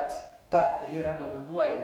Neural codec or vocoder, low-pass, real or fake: codec, 44.1 kHz, 2.6 kbps, DAC; 19.8 kHz; fake